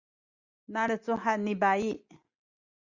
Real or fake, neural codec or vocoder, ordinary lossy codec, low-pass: real; none; Opus, 64 kbps; 7.2 kHz